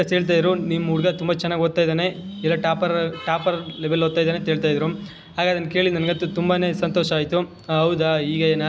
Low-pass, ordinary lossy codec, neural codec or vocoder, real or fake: none; none; none; real